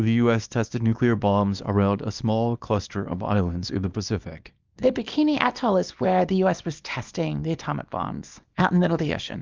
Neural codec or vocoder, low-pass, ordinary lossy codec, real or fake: codec, 24 kHz, 0.9 kbps, WavTokenizer, small release; 7.2 kHz; Opus, 24 kbps; fake